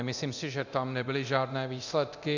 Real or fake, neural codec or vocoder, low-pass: fake; codec, 24 kHz, 0.9 kbps, DualCodec; 7.2 kHz